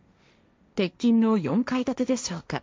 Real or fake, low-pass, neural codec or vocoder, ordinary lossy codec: fake; none; codec, 16 kHz, 1.1 kbps, Voila-Tokenizer; none